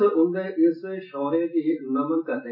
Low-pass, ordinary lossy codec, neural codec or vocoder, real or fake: 5.4 kHz; MP3, 24 kbps; none; real